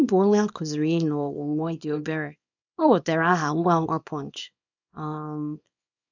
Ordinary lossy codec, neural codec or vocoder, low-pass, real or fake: none; codec, 24 kHz, 0.9 kbps, WavTokenizer, small release; 7.2 kHz; fake